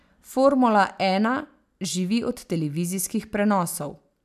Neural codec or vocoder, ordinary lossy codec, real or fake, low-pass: none; none; real; 14.4 kHz